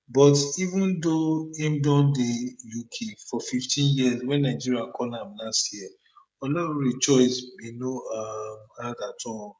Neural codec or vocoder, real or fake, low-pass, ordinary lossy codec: codec, 16 kHz, 16 kbps, FreqCodec, smaller model; fake; none; none